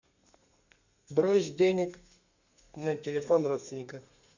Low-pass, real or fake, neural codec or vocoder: 7.2 kHz; fake; codec, 32 kHz, 1.9 kbps, SNAC